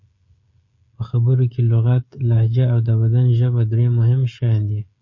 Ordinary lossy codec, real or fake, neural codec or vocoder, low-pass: MP3, 32 kbps; fake; codec, 16 kHz, 16 kbps, FreqCodec, smaller model; 7.2 kHz